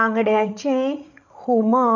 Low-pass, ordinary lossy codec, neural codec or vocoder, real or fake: 7.2 kHz; none; codec, 16 kHz, 8 kbps, FreqCodec, larger model; fake